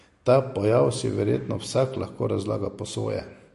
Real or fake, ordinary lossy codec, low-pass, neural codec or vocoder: real; MP3, 48 kbps; 14.4 kHz; none